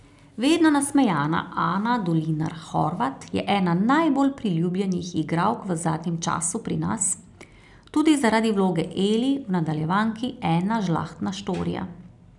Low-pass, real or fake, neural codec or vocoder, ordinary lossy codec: 10.8 kHz; real; none; none